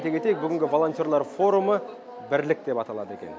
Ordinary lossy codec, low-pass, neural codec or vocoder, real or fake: none; none; none; real